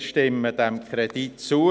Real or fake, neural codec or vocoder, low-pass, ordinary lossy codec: real; none; none; none